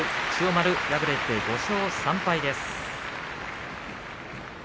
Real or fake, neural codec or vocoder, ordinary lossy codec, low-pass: real; none; none; none